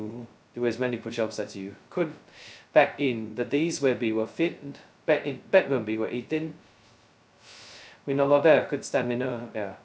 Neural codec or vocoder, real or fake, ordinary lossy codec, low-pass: codec, 16 kHz, 0.2 kbps, FocalCodec; fake; none; none